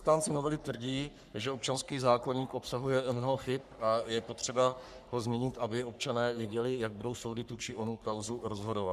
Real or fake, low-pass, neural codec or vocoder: fake; 14.4 kHz; codec, 44.1 kHz, 3.4 kbps, Pupu-Codec